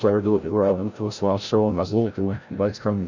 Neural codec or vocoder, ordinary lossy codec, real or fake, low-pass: codec, 16 kHz, 0.5 kbps, FreqCodec, larger model; none; fake; 7.2 kHz